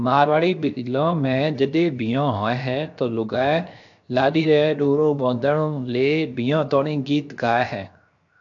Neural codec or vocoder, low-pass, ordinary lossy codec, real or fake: codec, 16 kHz, 0.7 kbps, FocalCodec; 7.2 kHz; AAC, 64 kbps; fake